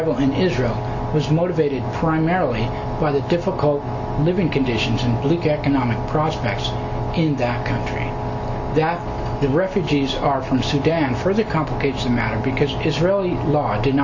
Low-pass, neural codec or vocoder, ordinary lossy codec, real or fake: 7.2 kHz; none; Opus, 64 kbps; real